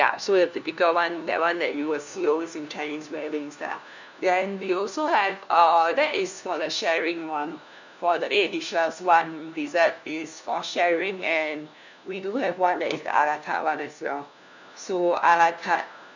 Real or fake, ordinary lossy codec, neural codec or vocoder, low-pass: fake; none; codec, 16 kHz, 1 kbps, FunCodec, trained on LibriTTS, 50 frames a second; 7.2 kHz